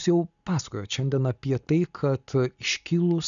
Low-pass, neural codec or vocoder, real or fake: 7.2 kHz; none; real